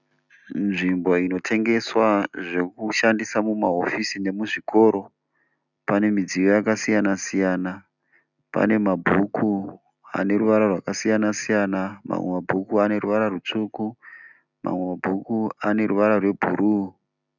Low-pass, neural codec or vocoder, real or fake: 7.2 kHz; none; real